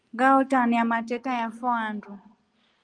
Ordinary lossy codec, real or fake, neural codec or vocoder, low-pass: Opus, 32 kbps; real; none; 9.9 kHz